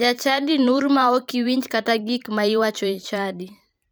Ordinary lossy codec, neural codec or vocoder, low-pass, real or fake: none; vocoder, 44.1 kHz, 128 mel bands every 512 samples, BigVGAN v2; none; fake